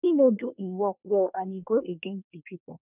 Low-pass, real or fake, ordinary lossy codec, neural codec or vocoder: 3.6 kHz; fake; none; codec, 24 kHz, 1 kbps, SNAC